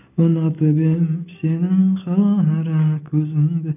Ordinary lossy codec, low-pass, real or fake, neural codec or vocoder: none; 3.6 kHz; real; none